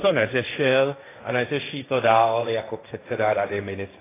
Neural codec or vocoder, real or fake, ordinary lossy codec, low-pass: codec, 16 kHz, 1.1 kbps, Voila-Tokenizer; fake; AAC, 16 kbps; 3.6 kHz